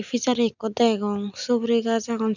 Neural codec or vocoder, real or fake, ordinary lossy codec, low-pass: none; real; none; 7.2 kHz